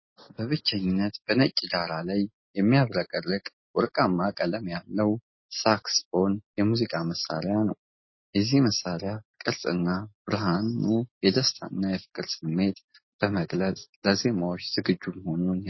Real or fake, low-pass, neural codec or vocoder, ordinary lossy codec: real; 7.2 kHz; none; MP3, 24 kbps